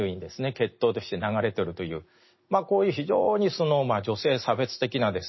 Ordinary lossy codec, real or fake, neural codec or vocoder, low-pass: MP3, 24 kbps; real; none; 7.2 kHz